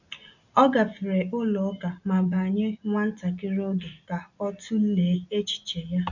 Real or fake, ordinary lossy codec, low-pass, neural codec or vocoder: real; none; 7.2 kHz; none